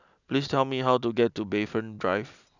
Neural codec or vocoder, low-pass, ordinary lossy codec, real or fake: none; 7.2 kHz; none; real